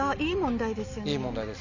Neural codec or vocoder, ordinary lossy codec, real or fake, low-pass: none; none; real; 7.2 kHz